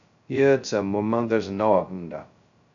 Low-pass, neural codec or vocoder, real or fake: 7.2 kHz; codec, 16 kHz, 0.2 kbps, FocalCodec; fake